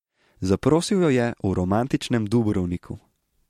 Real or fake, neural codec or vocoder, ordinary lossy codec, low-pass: real; none; MP3, 64 kbps; 19.8 kHz